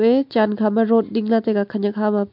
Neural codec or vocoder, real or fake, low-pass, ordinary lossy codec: none; real; 5.4 kHz; MP3, 48 kbps